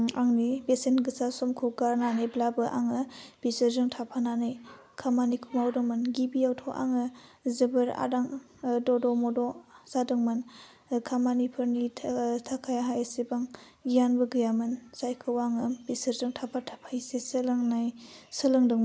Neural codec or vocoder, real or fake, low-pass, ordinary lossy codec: none; real; none; none